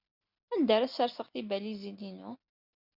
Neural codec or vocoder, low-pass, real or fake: none; 5.4 kHz; real